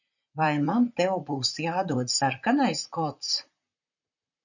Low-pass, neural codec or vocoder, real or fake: 7.2 kHz; vocoder, 44.1 kHz, 128 mel bands, Pupu-Vocoder; fake